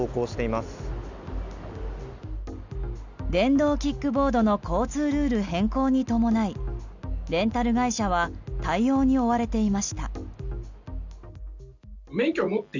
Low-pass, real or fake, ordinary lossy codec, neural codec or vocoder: 7.2 kHz; real; none; none